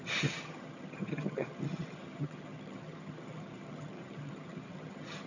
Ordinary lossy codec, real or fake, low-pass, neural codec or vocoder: none; fake; 7.2 kHz; vocoder, 22.05 kHz, 80 mel bands, HiFi-GAN